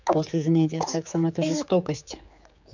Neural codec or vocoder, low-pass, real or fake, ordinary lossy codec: codec, 16 kHz, 4 kbps, X-Codec, HuBERT features, trained on general audio; 7.2 kHz; fake; none